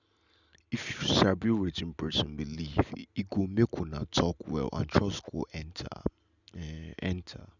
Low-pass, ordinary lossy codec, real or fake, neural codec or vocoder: 7.2 kHz; none; real; none